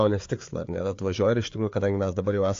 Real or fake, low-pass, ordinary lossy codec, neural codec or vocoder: fake; 7.2 kHz; AAC, 48 kbps; codec, 16 kHz, 4 kbps, FreqCodec, larger model